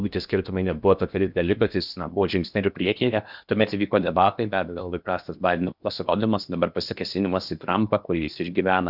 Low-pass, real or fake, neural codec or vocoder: 5.4 kHz; fake; codec, 16 kHz in and 24 kHz out, 0.8 kbps, FocalCodec, streaming, 65536 codes